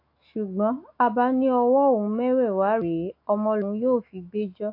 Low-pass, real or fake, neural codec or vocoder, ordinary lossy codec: 5.4 kHz; fake; autoencoder, 48 kHz, 128 numbers a frame, DAC-VAE, trained on Japanese speech; AAC, 32 kbps